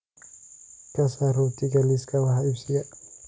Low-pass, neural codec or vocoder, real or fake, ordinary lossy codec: none; none; real; none